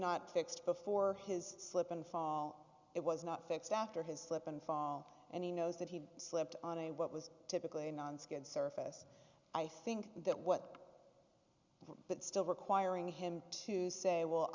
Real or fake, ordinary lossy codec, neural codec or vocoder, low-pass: real; Opus, 64 kbps; none; 7.2 kHz